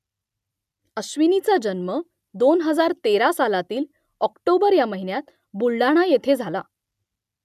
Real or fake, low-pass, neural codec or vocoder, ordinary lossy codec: real; 14.4 kHz; none; none